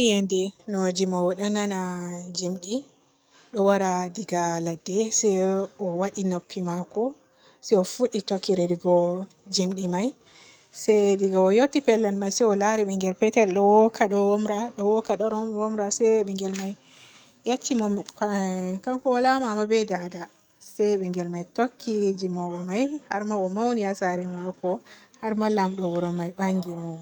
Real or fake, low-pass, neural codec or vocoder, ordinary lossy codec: fake; none; codec, 44.1 kHz, 7.8 kbps, DAC; none